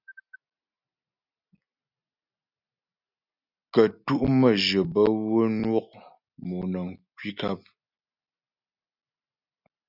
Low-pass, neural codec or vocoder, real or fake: 5.4 kHz; none; real